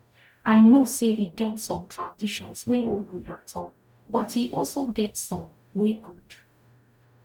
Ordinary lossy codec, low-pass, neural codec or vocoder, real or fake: none; 19.8 kHz; codec, 44.1 kHz, 0.9 kbps, DAC; fake